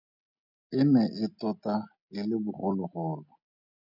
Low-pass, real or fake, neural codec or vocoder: 5.4 kHz; real; none